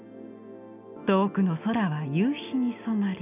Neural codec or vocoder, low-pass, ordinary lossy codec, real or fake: none; 3.6 kHz; none; real